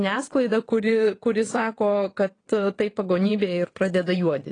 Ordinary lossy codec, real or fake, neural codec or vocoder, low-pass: AAC, 32 kbps; fake; vocoder, 22.05 kHz, 80 mel bands, WaveNeXt; 9.9 kHz